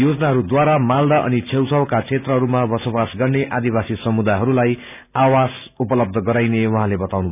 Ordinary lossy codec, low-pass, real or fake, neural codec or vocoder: none; 3.6 kHz; real; none